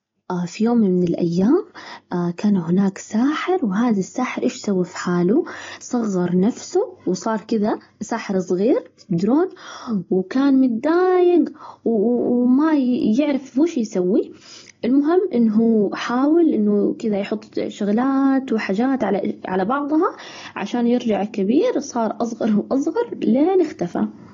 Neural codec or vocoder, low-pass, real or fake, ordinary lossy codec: none; 7.2 kHz; real; AAC, 32 kbps